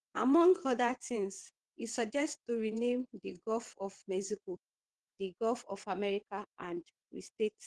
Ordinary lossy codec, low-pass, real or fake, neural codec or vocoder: Opus, 16 kbps; 9.9 kHz; fake; vocoder, 22.05 kHz, 80 mel bands, WaveNeXt